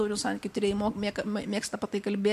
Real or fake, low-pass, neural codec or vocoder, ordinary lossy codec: real; 14.4 kHz; none; MP3, 64 kbps